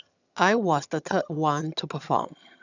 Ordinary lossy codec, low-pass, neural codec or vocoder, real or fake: none; 7.2 kHz; vocoder, 22.05 kHz, 80 mel bands, HiFi-GAN; fake